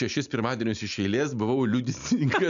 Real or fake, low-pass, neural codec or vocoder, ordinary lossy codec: real; 7.2 kHz; none; MP3, 96 kbps